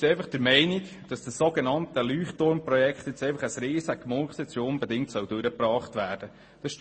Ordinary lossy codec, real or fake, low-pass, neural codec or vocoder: MP3, 32 kbps; real; 9.9 kHz; none